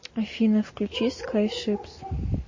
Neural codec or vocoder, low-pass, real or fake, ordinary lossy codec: none; 7.2 kHz; real; MP3, 32 kbps